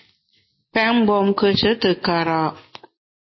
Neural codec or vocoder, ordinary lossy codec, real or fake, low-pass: none; MP3, 24 kbps; real; 7.2 kHz